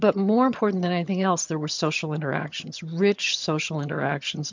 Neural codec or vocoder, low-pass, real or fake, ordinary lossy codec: vocoder, 22.05 kHz, 80 mel bands, HiFi-GAN; 7.2 kHz; fake; MP3, 64 kbps